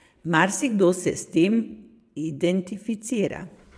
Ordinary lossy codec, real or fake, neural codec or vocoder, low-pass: none; fake; vocoder, 22.05 kHz, 80 mel bands, WaveNeXt; none